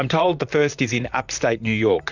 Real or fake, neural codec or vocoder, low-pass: fake; vocoder, 44.1 kHz, 128 mel bands, Pupu-Vocoder; 7.2 kHz